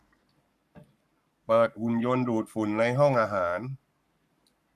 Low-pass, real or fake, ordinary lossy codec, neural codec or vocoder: 14.4 kHz; fake; none; codec, 44.1 kHz, 7.8 kbps, Pupu-Codec